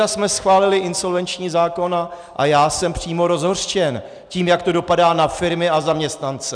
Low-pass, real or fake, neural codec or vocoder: 9.9 kHz; real; none